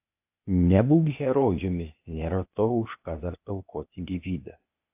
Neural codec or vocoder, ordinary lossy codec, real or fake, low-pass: codec, 16 kHz, 0.8 kbps, ZipCodec; AAC, 32 kbps; fake; 3.6 kHz